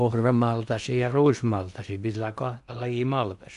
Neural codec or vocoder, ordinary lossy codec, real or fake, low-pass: codec, 16 kHz in and 24 kHz out, 0.8 kbps, FocalCodec, streaming, 65536 codes; none; fake; 10.8 kHz